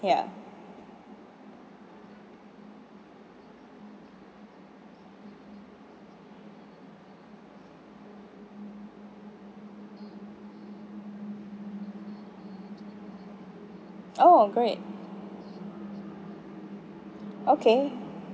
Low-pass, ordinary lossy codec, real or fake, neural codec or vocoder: none; none; real; none